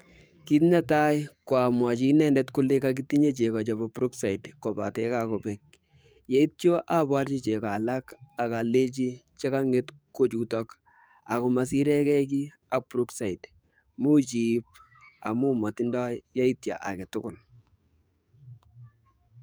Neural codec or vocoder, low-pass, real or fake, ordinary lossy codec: codec, 44.1 kHz, 7.8 kbps, DAC; none; fake; none